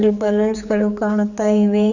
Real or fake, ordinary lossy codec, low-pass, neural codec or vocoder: fake; none; 7.2 kHz; codec, 16 kHz, 4 kbps, X-Codec, HuBERT features, trained on general audio